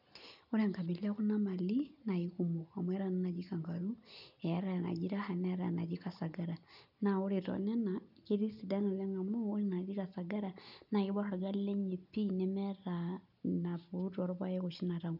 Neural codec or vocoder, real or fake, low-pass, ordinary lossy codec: none; real; 5.4 kHz; none